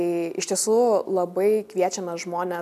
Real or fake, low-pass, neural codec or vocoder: real; 14.4 kHz; none